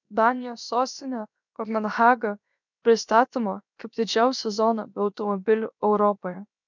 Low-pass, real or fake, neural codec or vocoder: 7.2 kHz; fake; codec, 16 kHz, about 1 kbps, DyCAST, with the encoder's durations